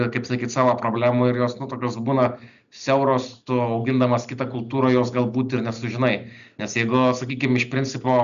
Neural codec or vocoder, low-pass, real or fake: none; 7.2 kHz; real